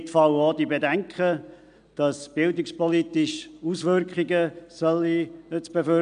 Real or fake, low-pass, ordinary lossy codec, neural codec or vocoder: real; 9.9 kHz; MP3, 64 kbps; none